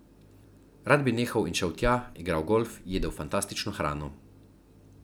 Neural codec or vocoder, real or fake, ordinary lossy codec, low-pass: none; real; none; none